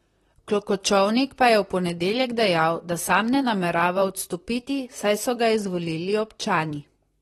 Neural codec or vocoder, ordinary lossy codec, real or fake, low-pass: vocoder, 44.1 kHz, 128 mel bands, Pupu-Vocoder; AAC, 32 kbps; fake; 19.8 kHz